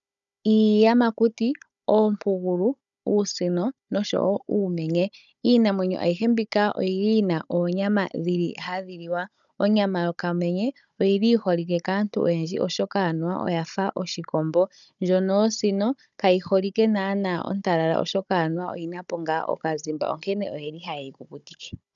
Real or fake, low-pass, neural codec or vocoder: fake; 7.2 kHz; codec, 16 kHz, 16 kbps, FunCodec, trained on Chinese and English, 50 frames a second